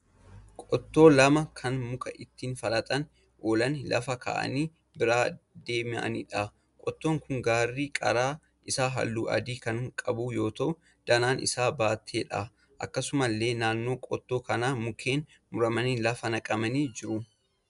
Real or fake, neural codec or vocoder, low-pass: real; none; 10.8 kHz